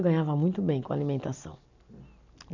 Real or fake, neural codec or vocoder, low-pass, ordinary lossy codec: real; none; 7.2 kHz; none